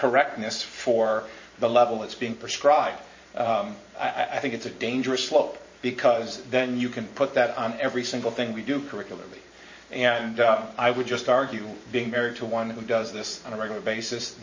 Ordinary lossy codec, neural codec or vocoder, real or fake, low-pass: MP3, 32 kbps; vocoder, 44.1 kHz, 128 mel bands every 512 samples, BigVGAN v2; fake; 7.2 kHz